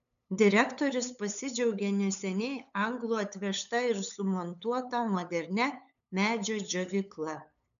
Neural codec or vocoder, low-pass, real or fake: codec, 16 kHz, 8 kbps, FunCodec, trained on LibriTTS, 25 frames a second; 7.2 kHz; fake